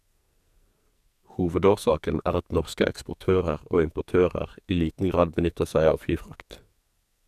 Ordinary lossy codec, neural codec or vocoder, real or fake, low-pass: none; codec, 44.1 kHz, 2.6 kbps, SNAC; fake; 14.4 kHz